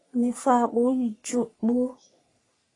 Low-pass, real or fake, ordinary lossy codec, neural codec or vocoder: 10.8 kHz; fake; AAC, 32 kbps; codec, 44.1 kHz, 3.4 kbps, Pupu-Codec